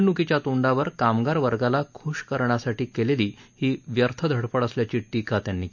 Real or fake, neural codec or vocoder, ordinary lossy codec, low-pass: real; none; none; 7.2 kHz